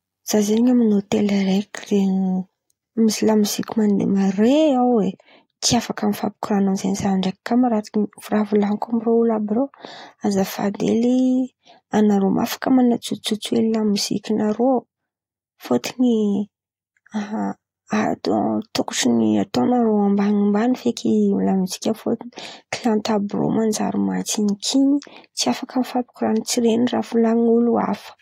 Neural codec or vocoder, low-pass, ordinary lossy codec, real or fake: none; 19.8 kHz; AAC, 48 kbps; real